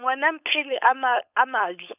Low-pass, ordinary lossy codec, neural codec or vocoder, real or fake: 3.6 kHz; none; codec, 16 kHz, 4.8 kbps, FACodec; fake